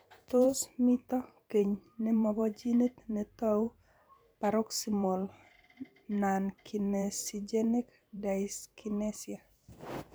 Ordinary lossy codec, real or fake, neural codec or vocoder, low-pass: none; fake; vocoder, 44.1 kHz, 128 mel bands every 512 samples, BigVGAN v2; none